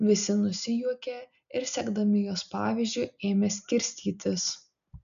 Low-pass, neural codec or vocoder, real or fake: 7.2 kHz; none; real